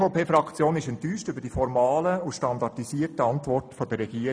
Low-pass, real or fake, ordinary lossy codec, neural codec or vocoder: none; real; none; none